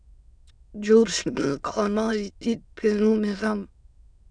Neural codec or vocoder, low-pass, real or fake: autoencoder, 22.05 kHz, a latent of 192 numbers a frame, VITS, trained on many speakers; 9.9 kHz; fake